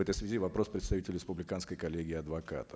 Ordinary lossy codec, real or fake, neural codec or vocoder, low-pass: none; real; none; none